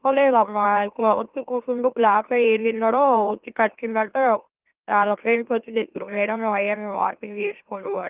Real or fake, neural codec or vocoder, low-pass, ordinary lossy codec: fake; autoencoder, 44.1 kHz, a latent of 192 numbers a frame, MeloTTS; 3.6 kHz; Opus, 32 kbps